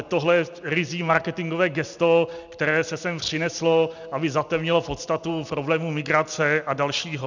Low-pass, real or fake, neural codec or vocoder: 7.2 kHz; real; none